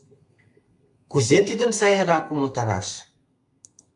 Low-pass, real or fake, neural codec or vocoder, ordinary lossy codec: 10.8 kHz; fake; codec, 32 kHz, 1.9 kbps, SNAC; MP3, 96 kbps